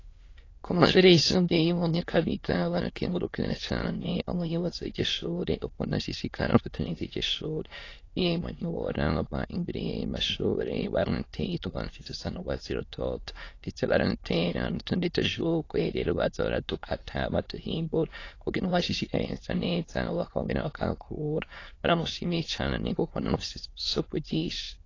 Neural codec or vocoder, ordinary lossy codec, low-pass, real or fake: autoencoder, 22.05 kHz, a latent of 192 numbers a frame, VITS, trained on many speakers; AAC, 32 kbps; 7.2 kHz; fake